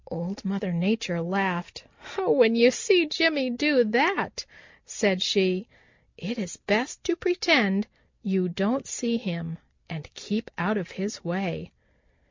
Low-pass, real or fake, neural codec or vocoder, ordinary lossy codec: 7.2 kHz; real; none; MP3, 64 kbps